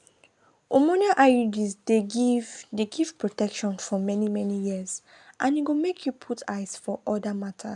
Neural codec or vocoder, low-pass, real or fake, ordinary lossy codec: none; 10.8 kHz; real; none